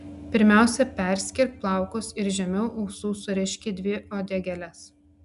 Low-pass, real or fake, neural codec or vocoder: 10.8 kHz; real; none